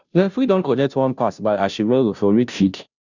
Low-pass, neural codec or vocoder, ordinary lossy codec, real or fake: 7.2 kHz; codec, 16 kHz, 0.5 kbps, FunCodec, trained on Chinese and English, 25 frames a second; none; fake